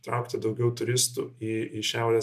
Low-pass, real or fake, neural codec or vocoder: 14.4 kHz; real; none